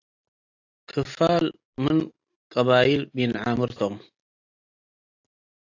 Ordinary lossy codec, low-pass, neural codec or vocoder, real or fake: AAC, 48 kbps; 7.2 kHz; none; real